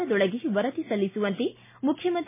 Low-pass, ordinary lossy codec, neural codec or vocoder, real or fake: 3.6 kHz; MP3, 16 kbps; none; real